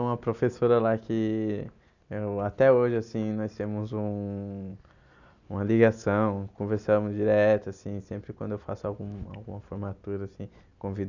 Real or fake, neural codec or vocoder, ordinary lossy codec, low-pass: real; none; none; 7.2 kHz